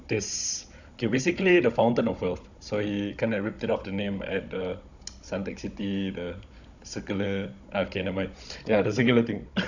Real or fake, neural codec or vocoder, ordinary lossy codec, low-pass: fake; codec, 16 kHz, 16 kbps, FunCodec, trained on Chinese and English, 50 frames a second; none; 7.2 kHz